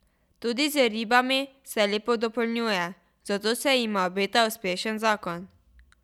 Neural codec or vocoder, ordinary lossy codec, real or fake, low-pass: none; none; real; 19.8 kHz